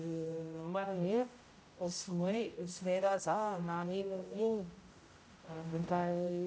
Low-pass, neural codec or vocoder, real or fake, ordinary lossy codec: none; codec, 16 kHz, 0.5 kbps, X-Codec, HuBERT features, trained on general audio; fake; none